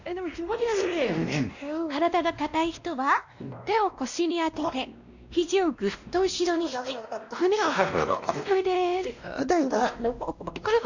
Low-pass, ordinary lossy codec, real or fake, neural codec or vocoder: 7.2 kHz; none; fake; codec, 16 kHz, 1 kbps, X-Codec, WavLM features, trained on Multilingual LibriSpeech